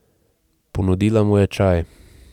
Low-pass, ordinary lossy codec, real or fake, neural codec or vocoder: 19.8 kHz; none; real; none